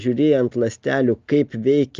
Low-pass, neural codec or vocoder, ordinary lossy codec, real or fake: 7.2 kHz; none; Opus, 24 kbps; real